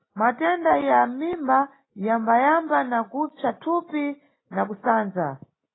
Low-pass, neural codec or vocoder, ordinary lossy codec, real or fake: 7.2 kHz; none; AAC, 16 kbps; real